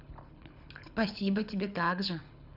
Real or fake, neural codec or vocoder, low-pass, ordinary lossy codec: fake; codec, 24 kHz, 6 kbps, HILCodec; 5.4 kHz; none